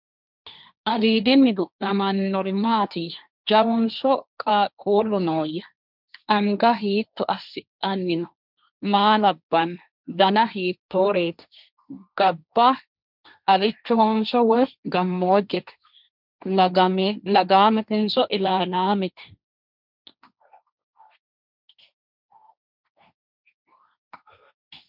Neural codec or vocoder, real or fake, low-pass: codec, 16 kHz, 1.1 kbps, Voila-Tokenizer; fake; 5.4 kHz